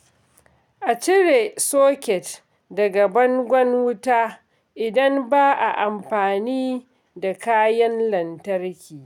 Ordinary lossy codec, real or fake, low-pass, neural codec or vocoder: none; real; 19.8 kHz; none